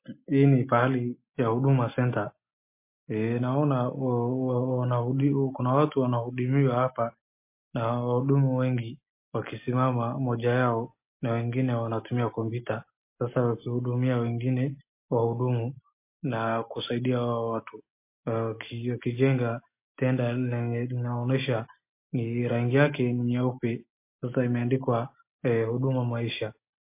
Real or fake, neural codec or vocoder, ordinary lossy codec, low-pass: real; none; MP3, 24 kbps; 3.6 kHz